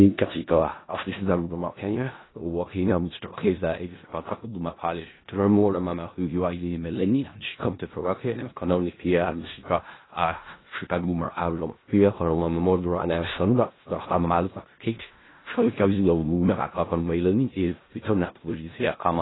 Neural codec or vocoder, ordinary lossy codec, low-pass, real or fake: codec, 16 kHz in and 24 kHz out, 0.4 kbps, LongCat-Audio-Codec, four codebook decoder; AAC, 16 kbps; 7.2 kHz; fake